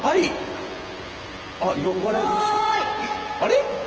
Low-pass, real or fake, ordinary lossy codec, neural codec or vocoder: 7.2 kHz; fake; Opus, 16 kbps; vocoder, 24 kHz, 100 mel bands, Vocos